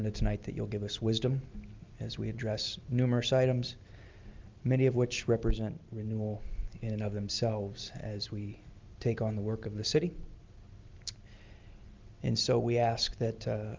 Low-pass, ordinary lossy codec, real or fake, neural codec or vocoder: 7.2 kHz; Opus, 32 kbps; real; none